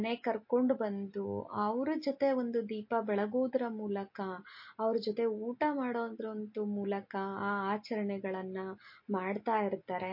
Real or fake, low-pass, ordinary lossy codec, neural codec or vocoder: real; 5.4 kHz; MP3, 32 kbps; none